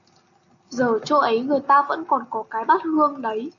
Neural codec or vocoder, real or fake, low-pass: none; real; 7.2 kHz